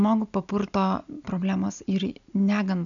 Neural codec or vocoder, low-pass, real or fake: none; 7.2 kHz; real